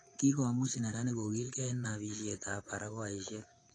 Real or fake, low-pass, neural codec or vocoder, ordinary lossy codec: fake; 9.9 kHz; vocoder, 24 kHz, 100 mel bands, Vocos; AAC, 32 kbps